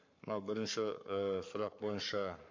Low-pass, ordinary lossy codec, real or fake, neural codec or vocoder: 7.2 kHz; MP3, 48 kbps; fake; codec, 44.1 kHz, 7.8 kbps, Pupu-Codec